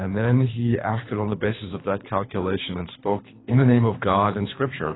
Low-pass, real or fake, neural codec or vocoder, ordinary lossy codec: 7.2 kHz; fake; codec, 24 kHz, 3 kbps, HILCodec; AAC, 16 kbps